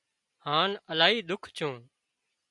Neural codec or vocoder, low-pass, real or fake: none; 10.8 kHz; real